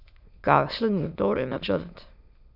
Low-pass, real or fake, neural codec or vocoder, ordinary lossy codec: 5.4 kHz; fake; autoencoder, 22.05 kHz, a latent of 192 numbers a frame, VITS, trained on many speakers; none